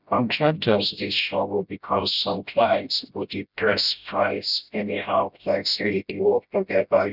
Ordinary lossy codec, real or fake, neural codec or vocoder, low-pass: none; fake; codec, 16 kHz, 0.5 kbps, FreqCodec, smaller model; 5.4 kHz